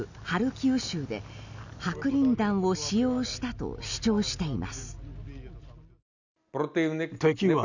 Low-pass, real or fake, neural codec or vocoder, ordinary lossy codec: 7.2 kHz; real; none; none